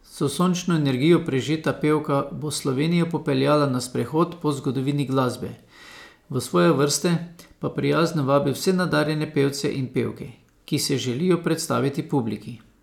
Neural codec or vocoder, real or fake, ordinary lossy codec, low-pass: none; real; none; 19.8 kHz